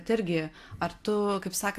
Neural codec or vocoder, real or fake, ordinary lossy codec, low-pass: none; real; AAC, 64 kbps; 14.4 kHz